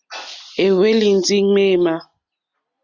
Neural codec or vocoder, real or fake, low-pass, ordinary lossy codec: none; real; 7.2 kHz; Opus, 64 kbps